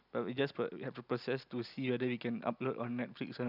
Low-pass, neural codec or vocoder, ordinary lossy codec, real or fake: 5.4 kHz; none; none; real